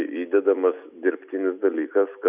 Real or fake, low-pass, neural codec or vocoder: real; 3.6 kHz; none